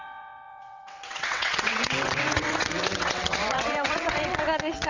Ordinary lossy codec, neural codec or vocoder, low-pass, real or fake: Opus, 64 kbps; vocoder, 22.05 kHz, 80 mel bands, WaveNeXt; 7.2 kHz; fake